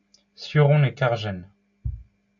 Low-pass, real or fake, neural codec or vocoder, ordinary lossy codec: 7.2 kHz; real; none; MP3, 48 kbps